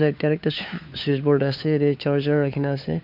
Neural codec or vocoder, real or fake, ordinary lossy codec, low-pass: codec, 16 kHz, 4 kbps, FunCodec, trained on LibriTTS, 50 frames a second; fake; none; 5.4 kHz